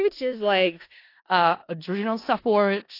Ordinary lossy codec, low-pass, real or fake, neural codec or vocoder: AAC, 32 kbps; 5.4 kHz; fake; codec, 16 kHz in and 24 kHz out, 0.4 kbps, LongCat-Audio-Codec, four codebook decoder